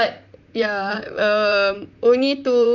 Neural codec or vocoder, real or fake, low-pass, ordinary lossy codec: vocoder, 44.1 kHz, 128 mel bands, Pupu-Vocoder; fake; 7.2 kHz; none